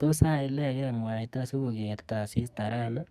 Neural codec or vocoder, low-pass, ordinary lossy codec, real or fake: codec, 44.1 kHz, 2.6 kbps, SNAC; 14.4 kHz; Opus, 64 kbps; fake